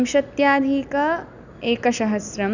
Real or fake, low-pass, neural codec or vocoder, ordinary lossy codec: real; 7.2 kHz; none; none